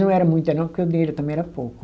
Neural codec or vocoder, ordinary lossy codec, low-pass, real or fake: none; none; none; real